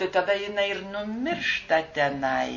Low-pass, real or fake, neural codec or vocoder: 7.2 kHz; real; none